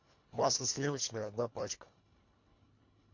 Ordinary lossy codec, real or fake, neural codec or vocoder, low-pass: MP3, 48 kbps; fake; codec, 24 kHz, 1.5 kbps, HILCodec; 7.2 kHz